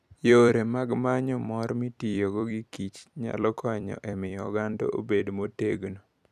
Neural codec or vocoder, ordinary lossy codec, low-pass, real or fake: vocoder, 44.1 kHz, 128 mel bands every 256 samples, BigVGAN v2; none; 14.4 kHz; fake